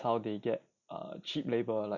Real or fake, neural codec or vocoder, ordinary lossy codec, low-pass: real; none; none; 7.2 kHz